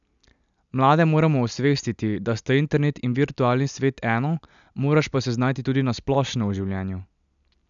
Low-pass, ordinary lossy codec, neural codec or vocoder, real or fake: 7.2 kHz; none; none; real